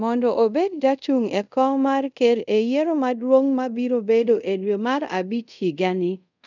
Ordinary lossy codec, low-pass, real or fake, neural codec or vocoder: none; 7.2 kHz; fake; codec, 24 kHz, 0.5 kbps, DualCodec